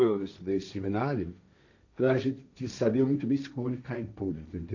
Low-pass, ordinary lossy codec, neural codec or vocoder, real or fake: 7.2 kHz; none; codec, 16 kHz, 1.1 kbps, Voila-Tokenizer; fake